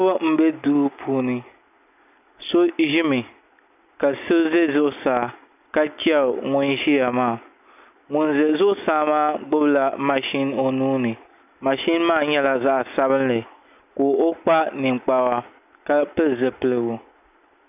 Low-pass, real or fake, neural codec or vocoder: 3.6 kHz; real; none